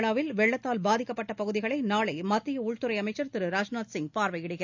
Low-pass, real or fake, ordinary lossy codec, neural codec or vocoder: 7.2 kHz; real; none; none